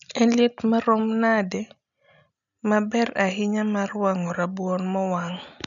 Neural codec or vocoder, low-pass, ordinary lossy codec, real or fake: none; 7.2 kHz; none; real